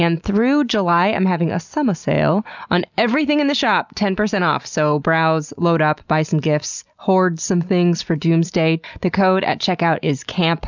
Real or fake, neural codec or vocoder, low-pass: real; none; 7.2 kHz